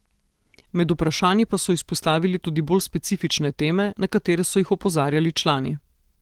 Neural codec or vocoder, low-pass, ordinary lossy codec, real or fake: autoencoder, 48 kHz, 128 numbers a frame, DAC-VAE, trained on Japanese speech; 19.8 kHz; Opus, 16 kbps; fake